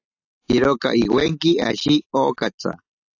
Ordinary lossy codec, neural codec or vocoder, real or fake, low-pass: AAC, 48 kbps; none; real; 7.2 kHz